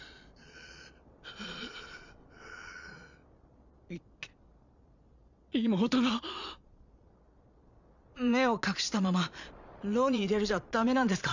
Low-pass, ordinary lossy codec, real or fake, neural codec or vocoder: 7.2 kHz; none; fake; vocoder, 22.05 kHz, 80 mel bands, Vocos